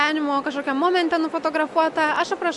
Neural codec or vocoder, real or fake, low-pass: none; real; 10.8 kHz